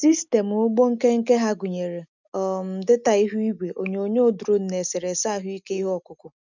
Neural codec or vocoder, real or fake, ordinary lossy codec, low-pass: none; real; none; 7.2 kHz